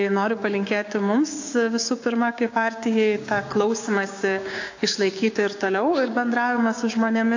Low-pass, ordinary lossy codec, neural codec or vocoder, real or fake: 7.2 kHz; AAC, 48 kbps; codec, 16 kHz, 6 kbps, DAC; fake